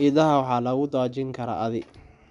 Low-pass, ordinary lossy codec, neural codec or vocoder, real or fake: 10.8 kHz; none; none; real